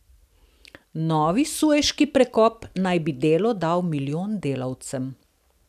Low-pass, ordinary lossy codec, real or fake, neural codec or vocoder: 14.4 kHz; none; real; none